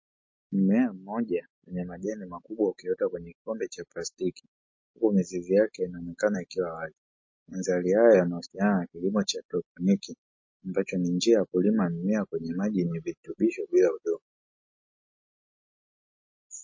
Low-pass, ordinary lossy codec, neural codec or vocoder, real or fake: 7.2 kHz; MP3, 32 kbps; none; real